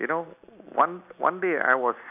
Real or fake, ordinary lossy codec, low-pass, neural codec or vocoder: real; none; 3.6 kHz; none